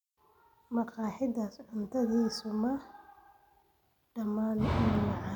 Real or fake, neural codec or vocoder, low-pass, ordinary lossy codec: real; none; 19.8 kHz; none